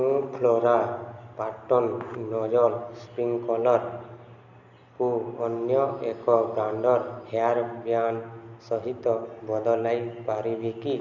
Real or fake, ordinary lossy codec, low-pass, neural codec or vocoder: real; none; 7.2 kHz; none